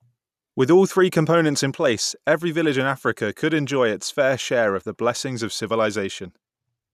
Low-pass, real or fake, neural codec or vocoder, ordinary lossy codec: 14.4 kHz; real; none; none